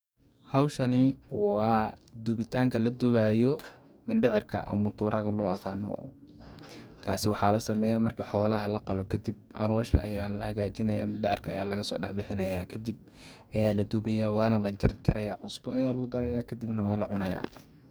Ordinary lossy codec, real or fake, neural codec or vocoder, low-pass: none; fake; codec, 44.1 kHz, 2.6 kbps, DAC; none